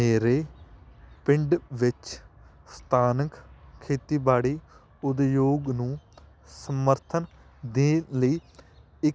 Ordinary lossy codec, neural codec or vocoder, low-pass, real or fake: none; none; none; real